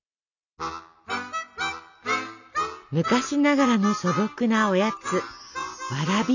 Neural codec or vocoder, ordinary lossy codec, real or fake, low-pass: none; none; real; 7.2 kHz